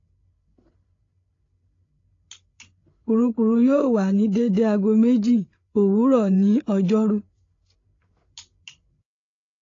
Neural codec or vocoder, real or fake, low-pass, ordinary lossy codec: codec, 16 kHz, 8 kbps, FreqCodec, larger model; fake; 7.2 kHz; AAC, 32 kbps